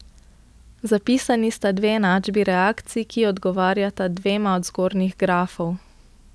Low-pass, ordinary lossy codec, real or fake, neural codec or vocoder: none; none; real; none